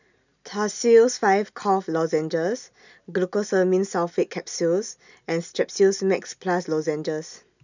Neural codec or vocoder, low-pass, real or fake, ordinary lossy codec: none; 7.2 kHz; real; none